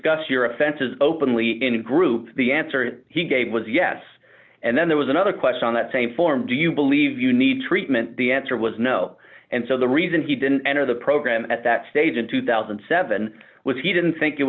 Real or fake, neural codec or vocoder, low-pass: real; none; 7.2 kHz